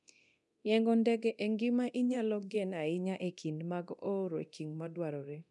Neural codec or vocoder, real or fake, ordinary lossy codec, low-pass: codec, 24 kHz, 0.9 kbps, DualCodec; fake; none; 10.8 kHz